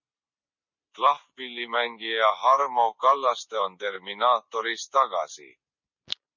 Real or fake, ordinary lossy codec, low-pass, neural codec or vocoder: real; MP3, 64 kbps; 7.2 kHz; none